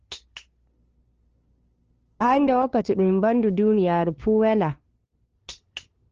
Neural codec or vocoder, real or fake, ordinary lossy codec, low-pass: codec, 16 kHz, 2 kbps, FunCodec, trained on LibriTTS, 25 frames a second; fake; Opus, 16 kbps; 7.2 kHz